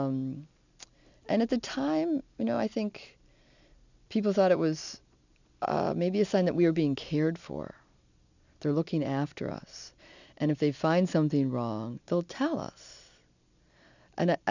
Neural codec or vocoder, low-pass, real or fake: none; 7.2 kHz; real